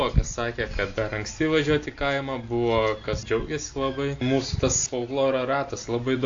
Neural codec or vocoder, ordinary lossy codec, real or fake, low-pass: none; AAC, 48 kbps; real; 7.2 kHz